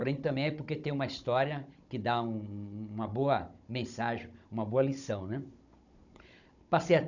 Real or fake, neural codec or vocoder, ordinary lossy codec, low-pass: fake; codec, 16 kHz, 16 kbps, FunCodec, trained on Chinese and English, 50 frames a second; none; 7.2 kHz